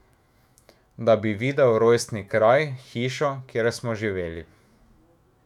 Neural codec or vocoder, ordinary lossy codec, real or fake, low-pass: autoencoder, 48 kHz, 128 numbers a frame, DAC-VAE, trained on Japanese speech; none; fake; 19.8 kHz